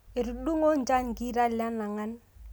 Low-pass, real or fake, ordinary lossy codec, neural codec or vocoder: none; real; none; none